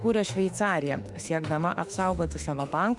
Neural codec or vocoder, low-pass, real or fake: autoencoder, 48 kHz, 32 numbers a frame, DAC-VAE, trained on Japanese speech; 10.8 kHz; fake